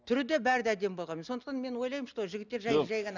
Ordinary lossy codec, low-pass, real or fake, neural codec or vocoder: none; 7.2 kHz; real; none